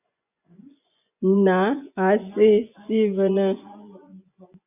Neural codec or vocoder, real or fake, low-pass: vocoder, 24 kHz, 100 mel bands, Vocos; fake; 3.6 kHz